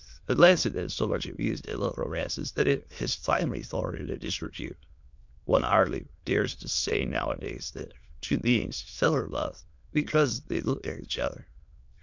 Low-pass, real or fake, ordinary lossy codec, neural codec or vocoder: 7.2 kHz; fake; MP3, 64 kbps; autoencoder, 22.05 kHz, a latent of 192 numbers a frame, VITS, trained on many speakers